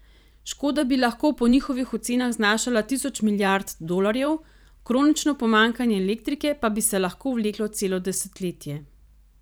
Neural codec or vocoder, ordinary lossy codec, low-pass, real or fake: vocoder, 44.1 kHz, 128 mel bands every 512 samples, BigVGAN v2; none; none; fake